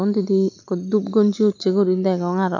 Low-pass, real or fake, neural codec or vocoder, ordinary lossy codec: 7.2 kHz; real; none; none